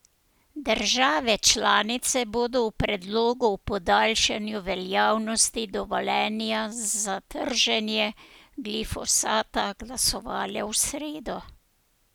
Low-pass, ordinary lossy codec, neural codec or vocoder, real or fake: none; none; none; real